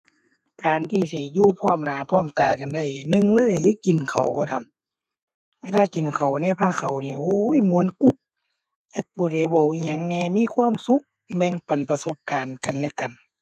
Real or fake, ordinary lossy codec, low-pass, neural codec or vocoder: fake; none; 14.4 kHz; codec, 32 kHz, 1.9 kbps, SNAC